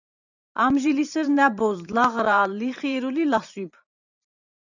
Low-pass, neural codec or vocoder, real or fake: 7.2 kHz; vocoder, 44.1 kHz, 128 mel bands every 512 samples, BigVGAN v2; fake